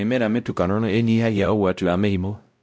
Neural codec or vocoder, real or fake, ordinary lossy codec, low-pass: codec, 16 kHz, 0.5 kbps, X-Codec, WavLM features, trained on Multilingual LibriSpeech; fake; none; none